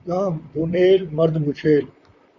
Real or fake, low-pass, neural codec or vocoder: fake; 7.2 kHz; vocoder, 44.1 kHz, 128 mel bands, Pupu-Vocoder